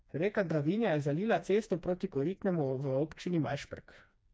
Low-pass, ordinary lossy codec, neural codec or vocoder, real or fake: none; none; codec, 16 kHz, 2 kbps, FreqCodec, smaller model; fake